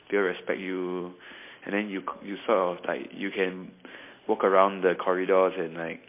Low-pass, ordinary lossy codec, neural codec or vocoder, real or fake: 3.6 kHz; MP3, 24 kbps; none; real